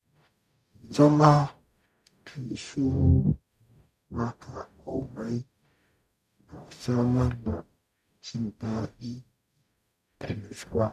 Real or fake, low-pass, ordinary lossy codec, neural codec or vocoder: fake; 14.4 kHz; none; codec, 44.1 kHz, 0.9 kbps, DAC